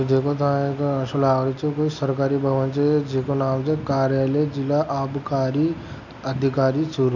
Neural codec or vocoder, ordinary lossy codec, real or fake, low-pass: none; none; real; 7.2 kHz